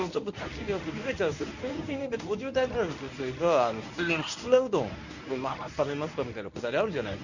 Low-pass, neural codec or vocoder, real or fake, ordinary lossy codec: 7.2 kHz; codec, 24 kHz, 0.9 kbps, WavTokenizer, medium speech release version 1; fake; none